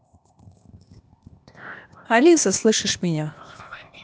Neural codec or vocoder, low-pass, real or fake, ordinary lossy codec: codec, 16 kHz, 0.8 kbps, ZipCodec; none; fake; none